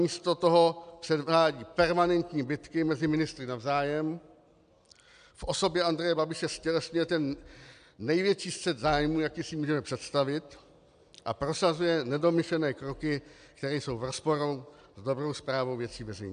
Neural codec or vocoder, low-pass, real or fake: none; 9.9 kHz; real